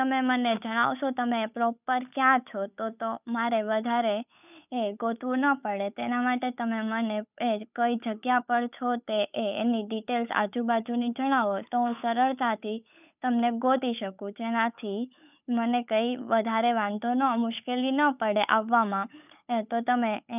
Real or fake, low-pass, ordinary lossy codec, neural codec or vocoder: fake; 3.6 kHz; none; codec, 16 kHz, 8 kbps, FunCodec, trained on Chinese and English, 25 frames a second